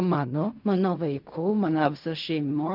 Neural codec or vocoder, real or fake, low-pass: codec, 16 kHz in and 24 kHz out, 0.4 kbps, LongCat-Audio-Codec, fine tuned four codebook decoder; fake; 5.4 kHz